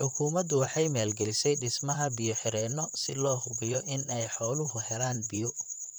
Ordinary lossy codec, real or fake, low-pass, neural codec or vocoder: none; fake; none; vocoder, 44.1 kHz, 128 mel bands, Pupu-Vocoder